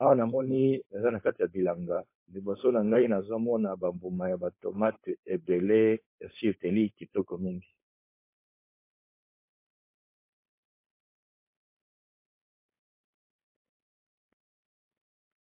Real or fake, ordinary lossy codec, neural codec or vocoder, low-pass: fake; MP3, 24 kbps; codec, 16 kHz, 4.8 kbps, FACodec; 3.6 kHz